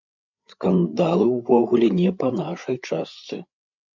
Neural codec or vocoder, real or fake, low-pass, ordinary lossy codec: codec, 16 kHz, 8 kbps, FreqCodec, larger model; fake; 7.2 kHz; MP3, 64 kbps